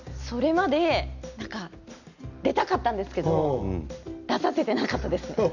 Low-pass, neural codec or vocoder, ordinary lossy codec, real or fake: 7.2 kHz; none; none; real